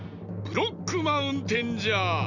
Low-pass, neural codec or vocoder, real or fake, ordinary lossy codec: 7.2 kHz; none; real; none